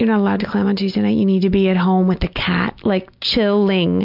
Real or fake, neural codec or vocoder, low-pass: real; none; 5.4 kHz